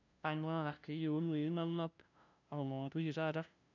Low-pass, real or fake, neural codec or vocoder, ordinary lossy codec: 7.2 kHz; fake; codec, 16 kHz, 0.5 kbps, FunCodec, trained on LibriTTS, 25 frames a second; none